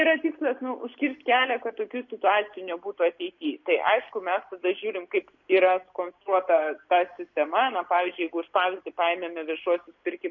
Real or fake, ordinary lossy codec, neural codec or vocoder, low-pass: real; MP3, 48 kbps; none; 7.2 kHz